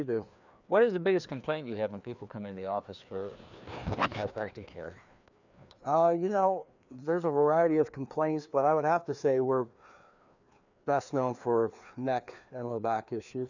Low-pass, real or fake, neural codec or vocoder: 7.2 kHz; fake; codec, 16 kHz, 2 kbps, FreqCodec, larger model